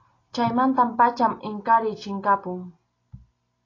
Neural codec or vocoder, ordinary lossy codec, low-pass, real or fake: none; AAC, 32 kbps; 7.2 kHz; real